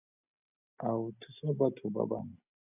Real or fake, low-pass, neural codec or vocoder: real; 3.6 kHz; none